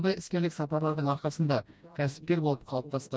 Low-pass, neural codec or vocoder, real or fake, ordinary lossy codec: none; codec, 16 kHz, 1 kbps, FreqCodec, smaller model; fake; none